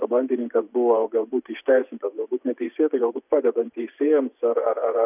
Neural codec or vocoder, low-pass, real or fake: vocoder, 24 kHz, 100 mel bands, Vocos; 3.6 kHz; fake